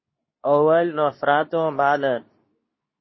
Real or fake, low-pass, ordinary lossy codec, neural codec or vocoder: fake; 7.2 kHz; MP3, 24 kbps; codec, 24 kHz, 0.9 kbps, WavTokenizer, medium speech release version 2